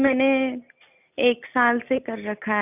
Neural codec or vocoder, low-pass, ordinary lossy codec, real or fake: none; 3.6 kHz; none; real